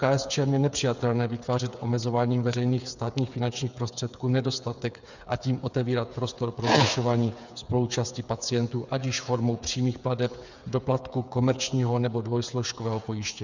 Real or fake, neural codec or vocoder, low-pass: fake; codec, 16 kHz, 8 kbps, FreqCodec, smaller model; 7.2 kHz